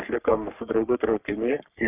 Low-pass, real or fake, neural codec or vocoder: 3.6 kHz; fake; codec, 44.1 kHz, 3.4 kbps, Pupu-Codec